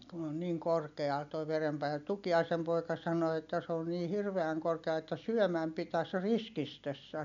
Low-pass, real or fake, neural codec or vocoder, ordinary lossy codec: 7.2 kHz; real; none; MP3, 96 kbps